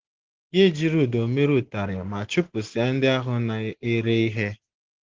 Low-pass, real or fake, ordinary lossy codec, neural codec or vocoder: 7.2 kHz; real; Opus, 16 kbps; none